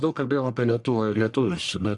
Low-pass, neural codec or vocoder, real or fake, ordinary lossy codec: 10.8 kHz; codec, 44.1 kHz, 1.7 kbps, Pupu-Codec; fake; Opus, 64 kbps